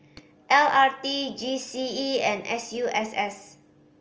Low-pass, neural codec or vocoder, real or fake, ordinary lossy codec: 7.2 kHz; none; real; Opus, 24 kbps